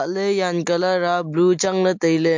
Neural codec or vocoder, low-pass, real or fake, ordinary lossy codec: none; 7.2 kHz; real; MP3, 48 kbps